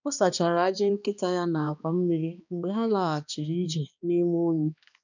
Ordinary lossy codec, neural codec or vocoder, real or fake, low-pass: none; codec, 16 kHz, 2 kbps, X-Codec, HuBERT features, trained on balanced general audio; fake; 7.2 kHz